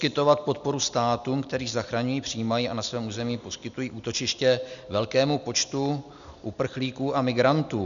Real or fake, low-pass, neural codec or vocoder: real; 7.2 kHz; none